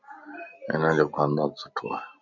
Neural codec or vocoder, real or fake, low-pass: none; real; 7.2 kHz